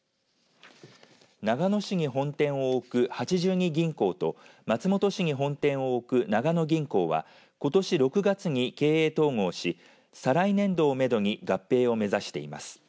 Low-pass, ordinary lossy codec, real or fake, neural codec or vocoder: none; none; real; none